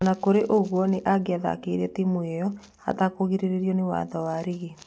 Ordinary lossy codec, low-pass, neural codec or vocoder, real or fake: none; none; none; real